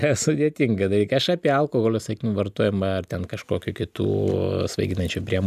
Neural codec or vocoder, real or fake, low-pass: none; real; 14.4 kHz